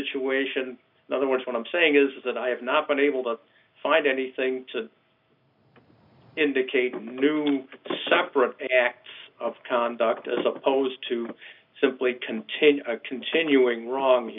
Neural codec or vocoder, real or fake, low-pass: none; real; 5.4 kHz